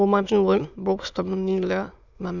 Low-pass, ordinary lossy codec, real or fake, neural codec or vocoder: 7.2 kHz; none; fake; autoencoder, 22.05 kHz, a latent of 192 numbers a frame, VITS, trained on many speakers